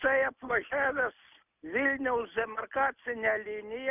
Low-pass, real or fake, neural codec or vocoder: 3.6 kHz; real; none